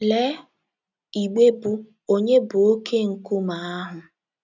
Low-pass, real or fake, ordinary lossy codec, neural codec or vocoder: 7.2 kHz; real; none; none